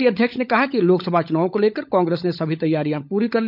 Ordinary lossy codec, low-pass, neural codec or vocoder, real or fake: none; 5.4 kHz; codec, 16 kHz, 16 kbps, FunCodec, trained on LibriTTS, 50 frames a second; fake